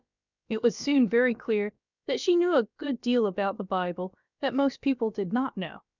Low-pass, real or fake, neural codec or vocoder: 7.2 kHz; fake; codec, 16 kHz, about 1 kbps, DyCAST, with the encoder's durations